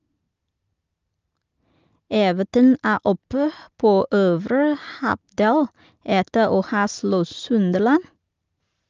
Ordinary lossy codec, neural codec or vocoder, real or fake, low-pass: Opus, 24 kbps; none; real; 7.2 kHz